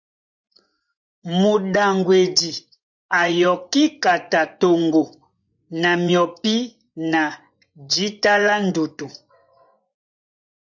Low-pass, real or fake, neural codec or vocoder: 7.2 kHz; fake; vocoder, 24 kHz, 100 mel bands, Vocos